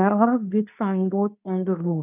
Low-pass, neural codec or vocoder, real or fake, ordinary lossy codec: 3.6 kHz; codec, 24 kHz, 0.9 kbps, WavTokenizer, medium speech release version 2; fake; none